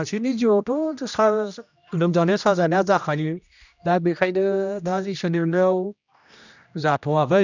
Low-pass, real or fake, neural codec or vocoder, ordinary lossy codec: 7.2 kHz; fake; codec, 16 kHz, 1 kbps, X-Codec, HuBERT features, trained on general audio; none